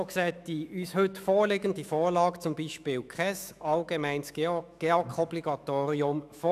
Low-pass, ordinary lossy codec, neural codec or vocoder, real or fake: 14.4 kHz; none; autoencoder, 48 kHz, 128 numbers a frame, DAC-VAE, trained on Japanese speech; fake